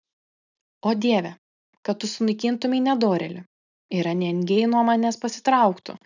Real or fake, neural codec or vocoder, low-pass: real; none; 7.2 kHz